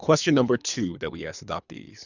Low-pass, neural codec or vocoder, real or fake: 7.2 kHz; codec, 24 kHz, 3 kbps, HILCodec; fake